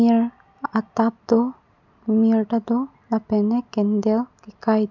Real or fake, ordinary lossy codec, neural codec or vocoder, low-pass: real; none; none; 7.2 kHz